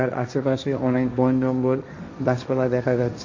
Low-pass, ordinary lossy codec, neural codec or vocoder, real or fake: 7.2 kHz; MP3, 48 kbps; codec, 16 kHz, 1.1 kbps, Voila-Tokenizer; fake